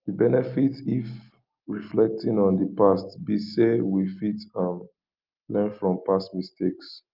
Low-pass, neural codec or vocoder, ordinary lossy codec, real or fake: 5.4 kHz; none; Opus, 24 kbps; real